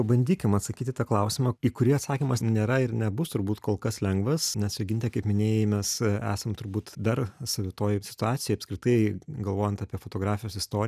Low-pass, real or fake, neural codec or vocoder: 14.4 kHz; real; none